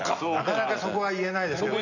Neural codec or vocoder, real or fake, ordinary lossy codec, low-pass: none; real; none; 7.2 kHz